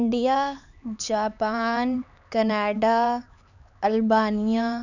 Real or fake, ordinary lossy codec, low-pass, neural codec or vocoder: fake; none; 7.2 kHz; codec, 16 kHz, 4 kbps, X-Codec, HuBERT features, trained on LibriSpeech